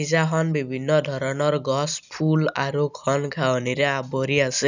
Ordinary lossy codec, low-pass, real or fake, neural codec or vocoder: none; 7.2 kHz; real; none